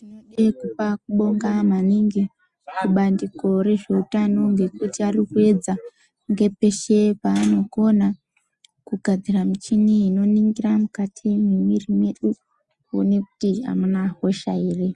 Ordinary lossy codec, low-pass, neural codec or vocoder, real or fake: Opus, 64 kbps; 10.8 kHz; none; real